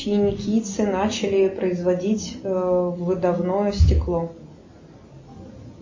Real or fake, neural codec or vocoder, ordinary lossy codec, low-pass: real; none; MP3, 32 kbps; 7.2 kHz